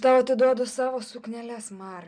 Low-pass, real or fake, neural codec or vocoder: 9.9 kHz; real; none